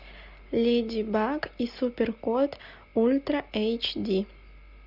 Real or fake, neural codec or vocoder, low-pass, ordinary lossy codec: real; none; 5.4 kHz; Opus, 64 kbps